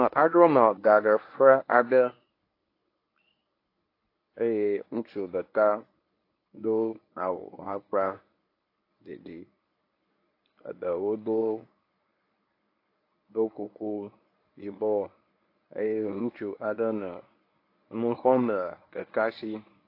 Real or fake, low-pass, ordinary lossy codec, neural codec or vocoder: fake; 5.4 kHz; AAC, 32 kbps; codec, 24 kHz, 0.9 kbps, WavTokenizer, medium speech release version 1